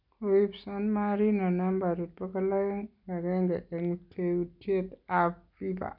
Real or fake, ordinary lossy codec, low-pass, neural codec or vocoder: real; none; 5.4 kHz; none